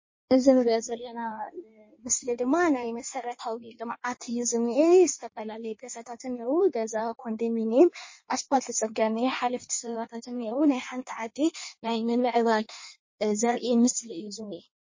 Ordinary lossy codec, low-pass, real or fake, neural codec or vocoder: MP3, 32 kbps; 7.2 kHz; fake; codec, 16 kHz in and 24 kHz out, 1.1 kbps, FireRedTTS-2 codec